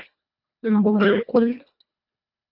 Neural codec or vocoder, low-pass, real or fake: codec, 24 kHz, 1.5 kbps, HILCodec; 5.4 kHz; fake